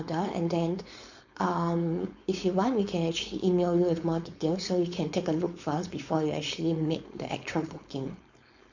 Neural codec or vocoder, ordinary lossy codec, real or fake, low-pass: codec, 16 kHz, 4.8 kbps, FACodec; MP3, 48 kbps; fake; 7.2 kHz